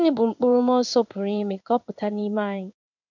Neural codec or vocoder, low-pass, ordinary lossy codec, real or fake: codec, 16 kHz in and 24 kHz out, 1 kbps, XY-Tokenizer; 7.2 kHz; none; fake